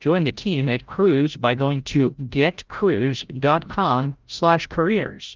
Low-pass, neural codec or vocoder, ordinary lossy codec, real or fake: 7.2 kHz; codec, 16 kHz, 0.5 kbps, FreqCodec, larger model; Opus, 32 kbps; fake